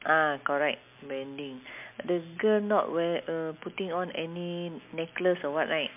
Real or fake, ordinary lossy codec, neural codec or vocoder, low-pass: real; MP3, 32 kbps; none; 3.6 kHz